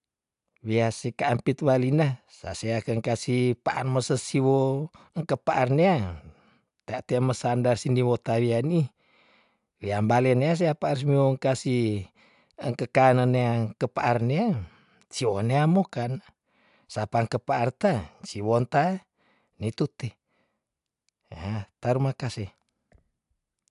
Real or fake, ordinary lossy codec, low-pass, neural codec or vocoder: real; AAC, 96 kbps; 10.8 kHz; none